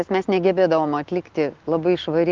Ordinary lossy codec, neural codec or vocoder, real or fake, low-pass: Opus, 16 kbps; none; real; 7.2 kHz